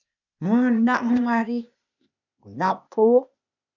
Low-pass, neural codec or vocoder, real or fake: 7.2 kHz; codec, 16 kHz, 0.8 kbps, ZipCodec; fake